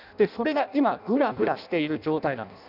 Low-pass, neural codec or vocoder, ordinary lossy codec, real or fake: 5.4 kHz; codec, 16 kHz in and 24 kHz out, 0.6 kbps, FireRedTTS-2 codec; none; fake